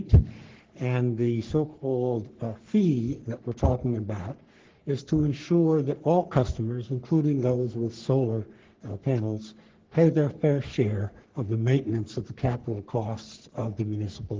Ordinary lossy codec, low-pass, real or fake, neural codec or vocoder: Opus, 16 kbps; 7.2 kHz; fake; codec, 44.1 kHz, 3.4 kbps, Pupu-Codec